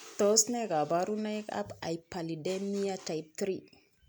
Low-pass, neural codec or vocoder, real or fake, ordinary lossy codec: none; none; real; none